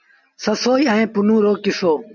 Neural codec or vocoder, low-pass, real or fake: none; 7.2 kHz; real